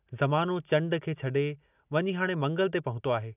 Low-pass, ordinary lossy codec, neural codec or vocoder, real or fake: 3.6 kHz; none; none; real